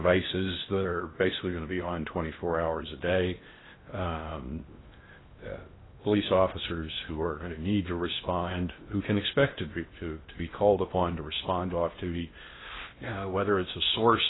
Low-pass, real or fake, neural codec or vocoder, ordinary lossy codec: 7.2 kHz; fake; codec, 16 kHz in and 24 kHz out, 0.6 kbps, FocalCodec, streaming, 4096 codes; AAC, 16 kbps